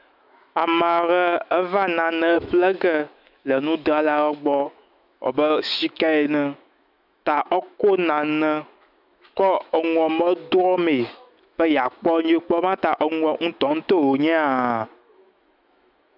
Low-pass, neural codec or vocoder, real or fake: 5.4 kHz; autoencoder, 48 kHz, 128 numbers a frame, DAC-VAE, trained on Japanese speech; fake